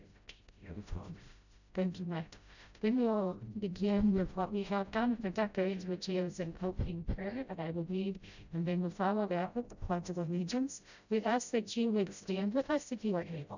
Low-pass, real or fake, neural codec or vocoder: 7.2 kHz; fake; codec, 16 kHz, 0.5 kbps, FreqCodec, smaller model